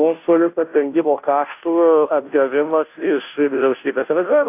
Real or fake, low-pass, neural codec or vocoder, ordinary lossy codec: fake; 3.6 kHz; codec, 16 kHz, 0.5 kbps, FunCodec, trained on Chinese and English, 25 frames a second; AAC, 32 kbps